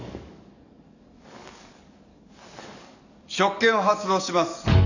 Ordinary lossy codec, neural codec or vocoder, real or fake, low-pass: none; codec, 44.1 kHz, 7.8 kbps, DAC; fake; 7.2 kHz